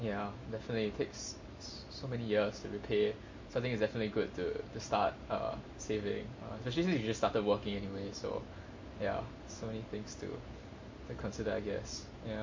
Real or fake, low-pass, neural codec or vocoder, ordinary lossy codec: real; 7.2 kHz; none; MP3, 32 kbps